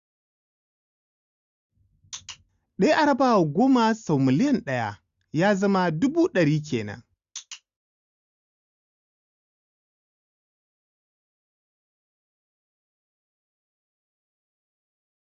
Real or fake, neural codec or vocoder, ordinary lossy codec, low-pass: real; none; Opus, 64 kbps; 7.2 kHz